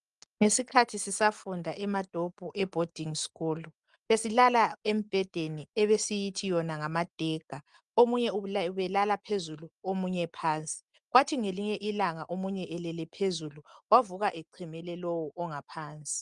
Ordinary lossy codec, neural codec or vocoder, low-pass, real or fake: Opus, 32 kbps; none; 10.8 kHz; real